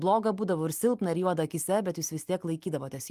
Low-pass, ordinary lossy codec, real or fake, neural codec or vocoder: 14.4 kHz; Opus, 32 kbps; real; none